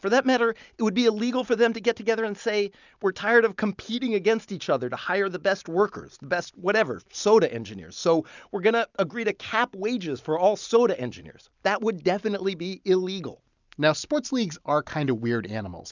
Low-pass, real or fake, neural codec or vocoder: 7.2 kHz; real; none